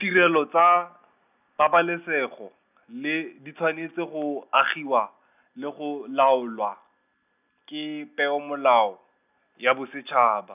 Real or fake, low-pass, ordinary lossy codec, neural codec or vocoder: real; 3.6 kHz; none; none